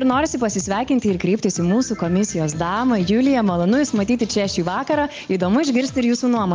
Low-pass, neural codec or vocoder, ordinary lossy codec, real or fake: 7.2 kHz; none; Opus, 24 kbps; real